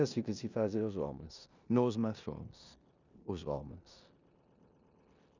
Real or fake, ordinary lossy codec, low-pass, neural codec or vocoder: fake; none; 7.2 kHz; codec, 16 kHz in and 24 kHz out, 0.9 kbps, LongCat-Audio-Codec, four codebook decoder